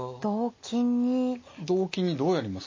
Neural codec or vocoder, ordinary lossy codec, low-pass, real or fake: none; MP3, 32 kbps; 7.2 kHz; real